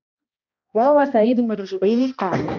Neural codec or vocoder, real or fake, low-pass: codec, 16 kHz, 1 kbps, X-Codec, HuBERT features, trained on balanced general audio; fake; 7.2 kHz